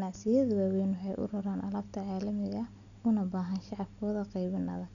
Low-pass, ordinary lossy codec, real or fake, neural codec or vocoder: 7.2 kHz; none; real; none